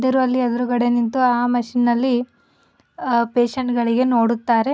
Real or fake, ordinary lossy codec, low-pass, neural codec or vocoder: real; none; none; none